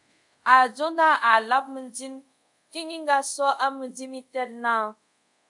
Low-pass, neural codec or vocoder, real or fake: 10.8 kHz; codec, 24 kHz, 0.5 kbps, DualCodec; fake